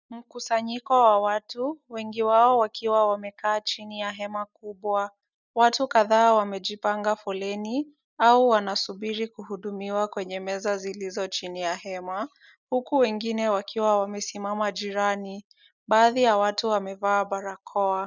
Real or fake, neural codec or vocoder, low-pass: real; none; 7.2 kHz